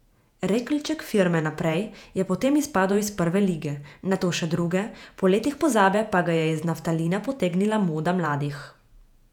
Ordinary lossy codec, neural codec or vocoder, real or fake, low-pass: none; vocoder, 48 kHz, 128 mel bands, Vocos; fake; 19.8 kHz